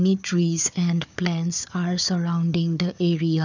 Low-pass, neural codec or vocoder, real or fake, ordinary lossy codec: 7.2 kHz; codec, 16 kHz, 4 kbps, FunCodec, trained on Chinese and English, 50 frames a second; fake; none